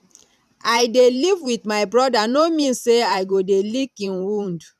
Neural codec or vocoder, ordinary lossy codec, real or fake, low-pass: vocoder, 44.1 kHz, 128 mel bands every 512 samples, BigVGAN v2; none; fake; 14.4 kHz